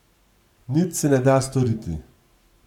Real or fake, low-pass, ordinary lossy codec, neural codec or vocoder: fake; 19.8 kHz; none; codec, 44.1 kHz, 7.8 kbps, Pupu-Codec